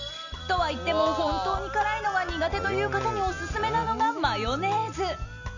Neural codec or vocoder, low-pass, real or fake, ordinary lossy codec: none; 7.2 kHz; real; none